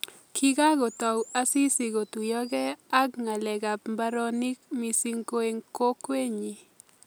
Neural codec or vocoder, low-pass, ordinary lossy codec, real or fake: none; none; none; real